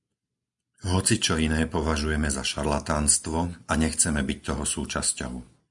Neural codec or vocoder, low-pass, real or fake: none; 10.8 kHz; real